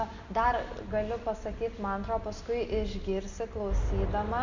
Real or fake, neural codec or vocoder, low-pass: real; none; 7.2 kHz